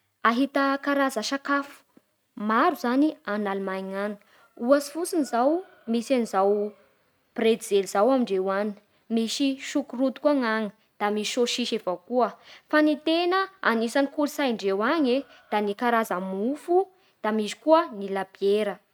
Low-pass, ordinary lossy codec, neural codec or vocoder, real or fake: none; none; none; real